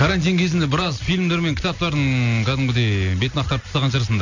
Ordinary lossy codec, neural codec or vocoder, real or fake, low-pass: none; none; real; 7.2 kHz